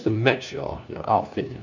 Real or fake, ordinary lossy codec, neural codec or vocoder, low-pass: fake; none; codec, 16 kHz, 1 kbps, FunCodec, trained on LibriTTS, 50 frames a second; 7.2 kHz